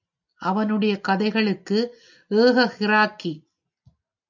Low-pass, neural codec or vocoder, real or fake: 7.2 kHz; none; real